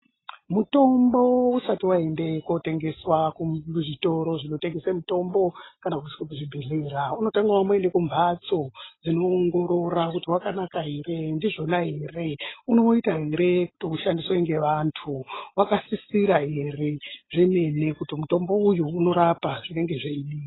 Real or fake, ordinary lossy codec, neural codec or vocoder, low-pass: real; AAC, 16 kbps; none; 7.2 kHz